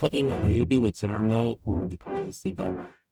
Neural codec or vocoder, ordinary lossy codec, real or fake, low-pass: codec, 44.1 kHz, 0.9 kbps, DAC; none; fake; none